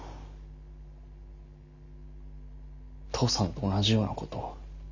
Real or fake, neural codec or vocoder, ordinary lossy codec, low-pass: real; none; none; 7.2 kHz